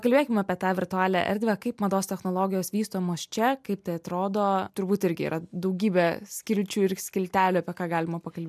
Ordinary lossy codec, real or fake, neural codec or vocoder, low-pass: MP3, 96 kbps; real; none; 14.4 kHz